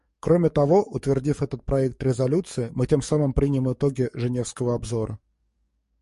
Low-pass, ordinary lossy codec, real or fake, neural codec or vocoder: 14.4 kHz; MP3, 48 kbps; fake; codec, 44.1 kHz, 7.8 kbps, DAC